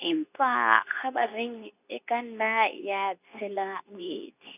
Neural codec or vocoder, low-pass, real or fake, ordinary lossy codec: codec, 24 kHz, 0.9 kbps, WavTokenizer, medium speech release version 2; 3.6 kHz; fake; none